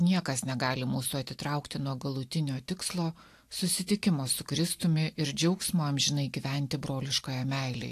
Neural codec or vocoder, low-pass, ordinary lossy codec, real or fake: none; 14.4 kHz; AAC, 64 kbps; real